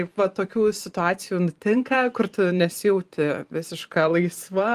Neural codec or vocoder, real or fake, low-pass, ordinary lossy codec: vocoder, 44.1 kHz, 128 mel bands every 512 samples, BigVGAN v2; fake; 14.4 kHz; Opus, 32 kbps